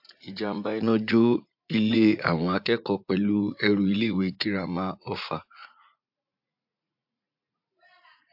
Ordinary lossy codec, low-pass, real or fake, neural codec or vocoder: AAC, 48 kbps; 5.4 kHz; fake; vocoder, 44.1 kHz, 80 mel bands, Vocos